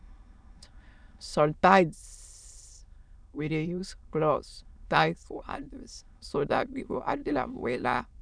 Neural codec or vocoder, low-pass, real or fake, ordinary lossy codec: autoencoder, 22.05 kHz, a latent of 192 numbers a frame, VITS, trained on many speakers; 9.9 kHz; fake; none